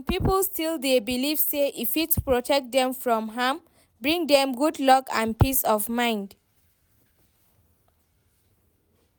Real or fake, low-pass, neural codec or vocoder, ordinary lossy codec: real; none; none; none